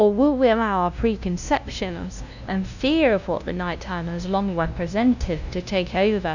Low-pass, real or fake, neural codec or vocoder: 7.2 kHz; fake; codec, 16 kHz, 0.5 kbps, FunCodec, trained on LibriTTS, 25 frames a second